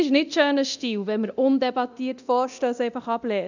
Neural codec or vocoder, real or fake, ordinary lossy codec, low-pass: codec, 24 kHz, 0.9 kbps, DualCodec; fake; none; 7.2 kHz